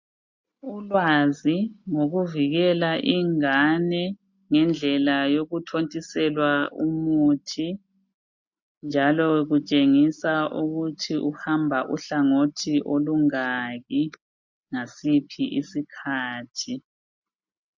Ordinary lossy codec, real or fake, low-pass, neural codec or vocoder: MP3, 48 kbps; real; 7.2 kHz; none